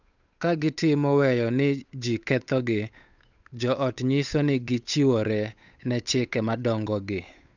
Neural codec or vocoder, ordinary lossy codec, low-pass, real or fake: codec, 16 kHz, 8 kbps, FunCodec, trained on Chinese and English, 25 frames a second; none; 7.2 kHz; fake